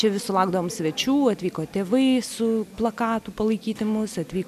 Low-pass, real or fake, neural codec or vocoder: 14.4 kHz; real; none